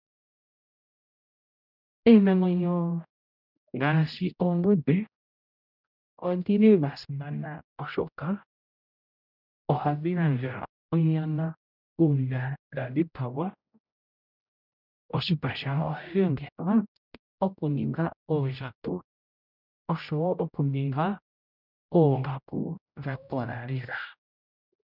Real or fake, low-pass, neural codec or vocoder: fake; 5.4 kHz; codec, 16 kHz, 0.5 kbps, X-Codec, HuBERT features, trained on general audio